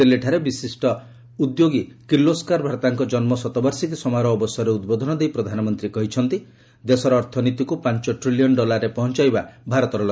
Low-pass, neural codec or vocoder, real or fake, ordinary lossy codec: none; none; real; none